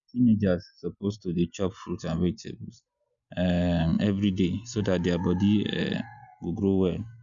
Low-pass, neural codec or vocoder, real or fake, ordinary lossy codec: 7.2 kHz; none; real; none